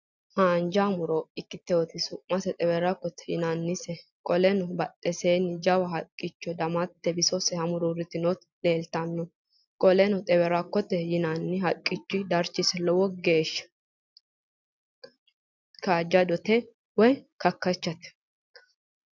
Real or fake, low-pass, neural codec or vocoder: real; 7.2 kHz; none